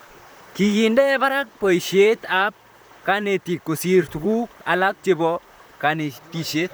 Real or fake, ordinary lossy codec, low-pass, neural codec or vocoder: fake; none; none; vocoder, 44.1 kHz, 128 mel bands, Pupu-Vocoder